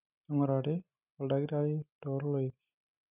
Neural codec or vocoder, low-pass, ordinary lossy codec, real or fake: none; 3.6 kHz; none; real